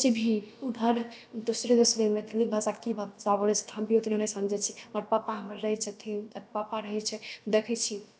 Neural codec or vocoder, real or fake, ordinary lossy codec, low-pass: codec, 16 kHz, about 1 kbps, DyCAST, with the encoder's durations; fake; none; none